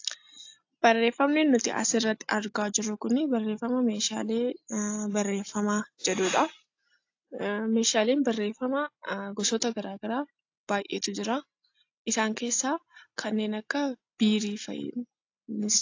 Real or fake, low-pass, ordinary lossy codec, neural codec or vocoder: real; 7.2 kHz; AAC, 48 kbps; none